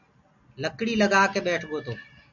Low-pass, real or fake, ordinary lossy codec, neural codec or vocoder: 7.2 kHz; real; MP3, 64 kbps; none